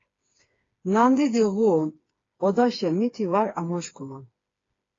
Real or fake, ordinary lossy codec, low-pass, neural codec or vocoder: fake; AAC, 32 kbps; 7.2 kHz; codec, 16 kHz, 4 kbps, FreqCodec, smaller model